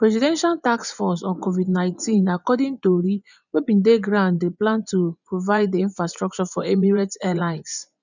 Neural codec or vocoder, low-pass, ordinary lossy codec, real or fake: vocoder, 24 kHz, 100 mel bands, Vocos; 7.2 kHz; none; fake